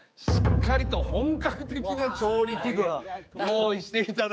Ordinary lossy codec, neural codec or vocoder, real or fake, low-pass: none; codec, 16 kHz, 4 kbps, X-Codec, HuBERT features, trained on general audio; fake; none